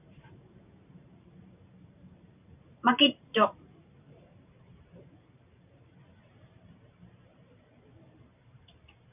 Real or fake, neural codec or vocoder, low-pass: fake; vocoder, 44.1 kHz, 128 mel bands every 512 samples, BigVGAN v2; 3.6 kHz